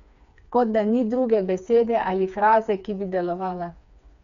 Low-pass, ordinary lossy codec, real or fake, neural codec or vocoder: 7.2 kHz; none; fake; codec, 16 kHz, 4 kbps, FreqCodec, smaller model